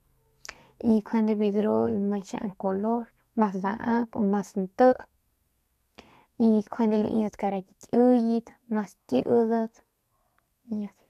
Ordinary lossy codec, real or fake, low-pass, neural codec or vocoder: none; fake; 14.4 kHz; codec, 32 kHz, 1.9 kbps, SNAC